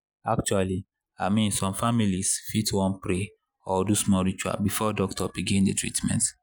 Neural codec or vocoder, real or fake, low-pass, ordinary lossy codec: none; real; none; none